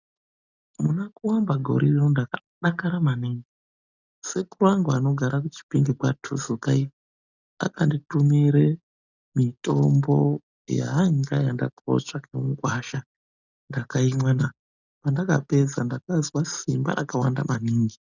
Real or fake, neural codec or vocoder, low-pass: real; none; 7.2 kHz